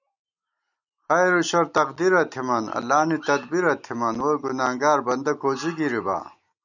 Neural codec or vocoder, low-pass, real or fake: none; 7.2 kHz; real